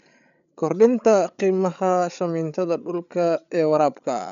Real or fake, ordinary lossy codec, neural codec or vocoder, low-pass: fake; none; codec, 16 kHz, 8 kbps, FreqCodec, larger model; 7.2 kHz